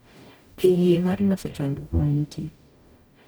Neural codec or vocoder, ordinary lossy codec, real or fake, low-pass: codec, 44.1 kHz, 0.9 kbps, DAC; none; fake; none